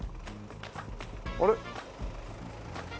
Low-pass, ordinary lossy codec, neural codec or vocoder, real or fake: none; none; none; real